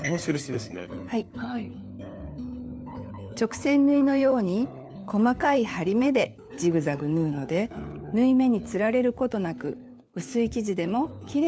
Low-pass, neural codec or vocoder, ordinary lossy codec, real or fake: none; codec, 16 kHz, 4 kbps, FunCodec, trained on LibriTTS, 50 frames a second; none; fake